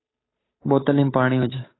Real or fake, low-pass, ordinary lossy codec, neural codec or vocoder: fake; 7.2 kHz; AAC, 16 kbps; codec, 16 kHz, 8 kbps, FunCodec, trained on Chinese and English, 25 frames a second